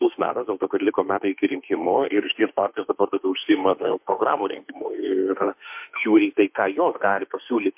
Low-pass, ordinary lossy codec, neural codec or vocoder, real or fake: 3.6 kHz; MP3, 32 kbps; autoencoder, 48 kHz, 32 numbers a frame, DAC-VAE, trained on Japanese speech; fake